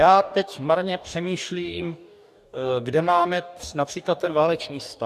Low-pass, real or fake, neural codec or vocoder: 14.4 kHz; fake; codec, 44.1 kHz, 2.6 kbps, DAC